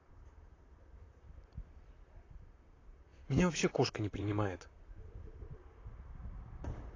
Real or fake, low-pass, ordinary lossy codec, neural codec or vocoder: fake; 7.2 kHz; AAC, 32 kbps; vocoder, 44.1 kHz, 128 mel bands, Pupu-Vocoder